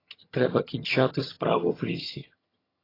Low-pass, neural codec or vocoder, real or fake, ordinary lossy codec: 5.4 kHz; vocoder, 22.05 kHz, 80 mel bands, HiFi-GAN; fake; AAC, 24 kbps